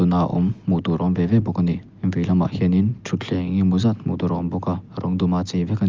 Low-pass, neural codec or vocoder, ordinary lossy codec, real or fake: 7.2 kHz; none; Opus, 32 kbps; real